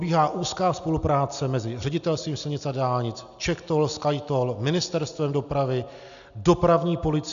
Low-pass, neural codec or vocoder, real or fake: 7.2 kHz; none; real